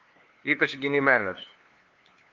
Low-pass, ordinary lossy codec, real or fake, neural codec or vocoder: 7.2 kHz; Opus, 32 kbps; fake; codec, 16 kHz, 2 kbps, FunCodec, trained on LibriTTS, 25 frames a second